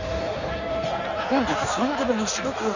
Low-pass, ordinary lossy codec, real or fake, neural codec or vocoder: 7.2 kHz; none; fake; codec, 16 kHz in and 24 kHz out, 1.1 kbps, FireRedTTS-2 codec